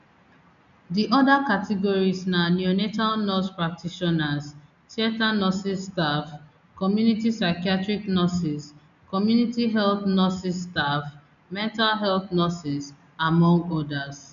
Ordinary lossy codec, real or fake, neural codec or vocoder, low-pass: none; real; none; 7.2 kHz